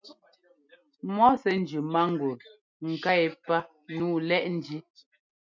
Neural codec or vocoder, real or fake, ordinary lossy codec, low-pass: none; real; MP3, 64 kbps; 7.2 kHz